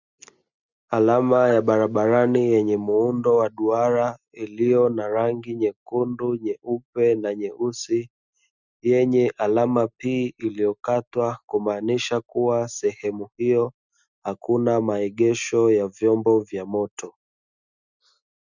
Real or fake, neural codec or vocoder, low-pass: real; none; 7.2 kHz